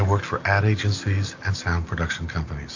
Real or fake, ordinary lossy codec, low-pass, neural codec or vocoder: real; AAC, 48 kbps; 7.2 kHz; none